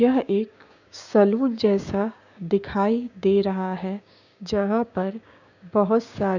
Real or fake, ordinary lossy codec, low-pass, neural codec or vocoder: fake; none; 7.2 kHz; codec, 16 kHz, 6 kbps, DAC